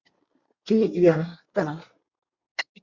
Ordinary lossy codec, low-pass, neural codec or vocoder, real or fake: Opus, 64 kbps; 7.2 kHz; codec, 24 kHz, 1 kbps, SNAC; fake